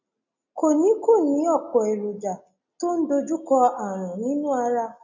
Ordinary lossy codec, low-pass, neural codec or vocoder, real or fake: none; 7.2 kHz; none; real